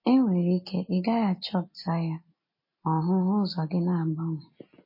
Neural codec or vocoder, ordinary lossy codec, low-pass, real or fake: none; MP3, 24 kbps; 5.4 kHz; real